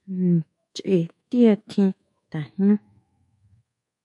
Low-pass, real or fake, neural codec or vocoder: 10.8 kHz; fake; codec, 24 kHz, 1.2 kbps, DualCodec